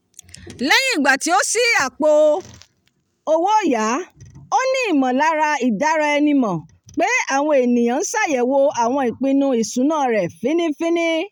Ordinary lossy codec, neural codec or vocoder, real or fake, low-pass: none; none; real; 19.8 kHz